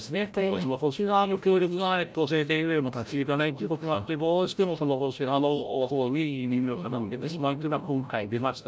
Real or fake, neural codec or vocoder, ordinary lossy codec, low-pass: fake; codec, 16 kHz, 0.5 kbps, FreqCodec, larger model; none; none